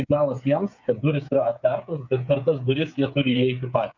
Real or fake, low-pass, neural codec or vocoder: fake; 7.2 kHz; codec, 16 kHz, 8 kbps, FreqCodec, smaller model